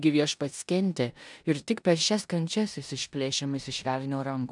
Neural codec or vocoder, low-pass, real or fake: codec, 16 kHz in and 24 kHz out, 0.9 kbps, LongCat-Audio-Codec, four codebook decoder; 10.8 kHz; fake